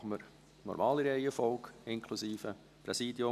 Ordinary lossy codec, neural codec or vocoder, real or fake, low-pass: none; none; real; 14.4 kHz